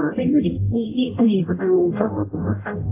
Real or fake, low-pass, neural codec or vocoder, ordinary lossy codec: fake; 3.6 kHz; codec, 44.1 kHz, 0.9 kbps, DAC; AAC, 24 kbps